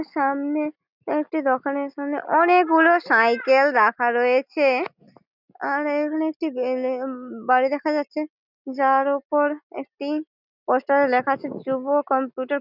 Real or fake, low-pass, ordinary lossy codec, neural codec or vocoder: real; 5.4 kHz; none; none